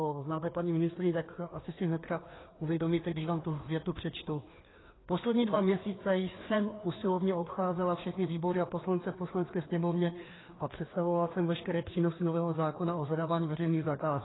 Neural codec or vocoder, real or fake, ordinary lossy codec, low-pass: codec, 16 kHz, 2 kbps, FreqCodec, larger model; fake; AAC, 16 kbps; 7.2 kHz